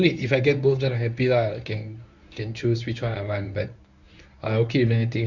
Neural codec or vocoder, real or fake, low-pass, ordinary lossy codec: codec, 24 kHz, 0.9 kbps, WavTokenizer, medium speech release version 1; fake; 7.2 kHz; none